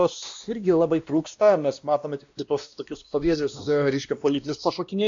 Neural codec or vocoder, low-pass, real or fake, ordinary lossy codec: codec, 16 kHz, 1 kbps, X-Codec, WavLM features, trained on Multilingual LibriSpeech; 7.2 kHz; fake; AAC, 64 kbps